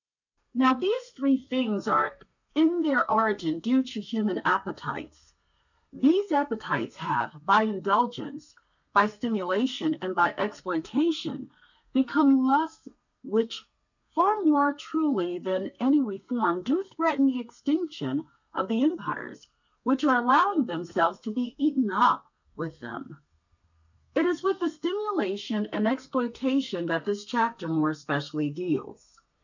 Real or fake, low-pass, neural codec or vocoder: fake; 7.2 kHz; codec, 32 kHz, 1.9 kbps, SNAC